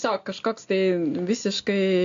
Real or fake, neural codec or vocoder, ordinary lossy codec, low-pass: real; none; AAC, 48 kbps; 7.2 kHz